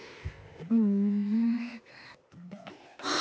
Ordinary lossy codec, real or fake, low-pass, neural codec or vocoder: none; fake; none; codec, 16 kHz, 0.8 kbps, ZipCodec